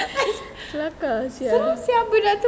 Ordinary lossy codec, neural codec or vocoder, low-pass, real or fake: none; none; none; real